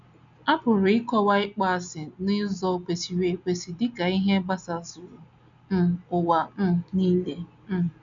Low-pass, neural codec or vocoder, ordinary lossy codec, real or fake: 7.2 kHz; none; none; real